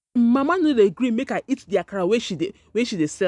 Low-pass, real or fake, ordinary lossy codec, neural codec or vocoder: 10.8 kHz; real; none; none